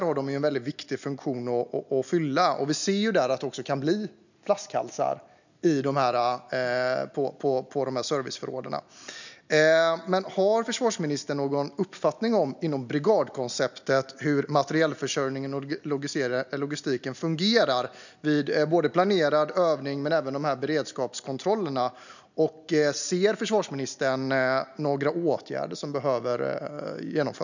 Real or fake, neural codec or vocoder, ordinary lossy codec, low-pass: real; none; none; 7.2 kHz